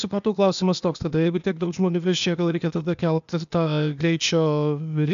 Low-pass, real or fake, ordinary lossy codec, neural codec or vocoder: 7.2 kHz; fake; AAC, 96 kbps; codec, 16 kHz, 0.8 kbps, ZipCodec